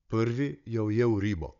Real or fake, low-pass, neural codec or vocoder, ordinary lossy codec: fake; 7.2 kHz; codec, 16 kHz, 16 kbps, FunCodec, trained on Chinese and English, 50 frames a second; none